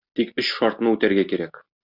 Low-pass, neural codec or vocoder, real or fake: 5.4 kHz; none; real